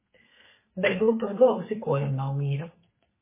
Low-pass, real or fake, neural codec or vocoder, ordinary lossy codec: 3.6 kHz; fake; codec, 32 kHz, 1.9 kbps, SNAC; MP3, 16 kbps